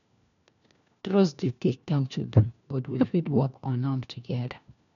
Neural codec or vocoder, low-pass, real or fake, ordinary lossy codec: codec, 16 kHz, 1 kbps, FunCodec, trained on LibriTTS, 50 frames a second; 7.2 kHz; fake; none